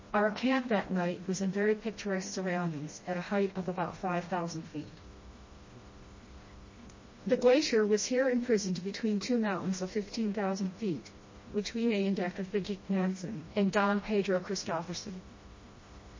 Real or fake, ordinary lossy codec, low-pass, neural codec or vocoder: fake; MP3, 32 kbps; 7.2 kHz; codec, 16 kHz, 1 kbps, FreqCodec, smaller model